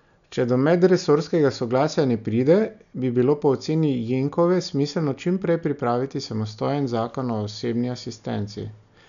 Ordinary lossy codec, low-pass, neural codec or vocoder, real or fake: none; 7.2 kHz; none; real